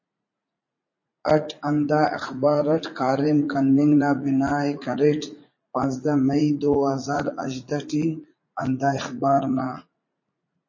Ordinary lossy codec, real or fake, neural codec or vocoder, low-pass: MP3, 32 kbps; fake; vocoder, 44.1 kHz, 80 mel bands, Vocos; 7.2 kHz